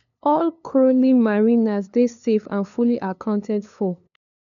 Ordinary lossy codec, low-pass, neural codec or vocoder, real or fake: none; 7.2 kHz; codec, 16 kHz, 2 kbps, FunCodec, trained on LibriTTS, 25 frames a second; fake